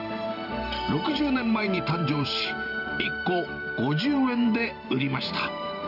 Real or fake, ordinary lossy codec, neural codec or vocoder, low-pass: fake; none; vocoder, 44.1 kHz, 128 mel bands every 512 samples, BigVGAN v2; 5.4 kHz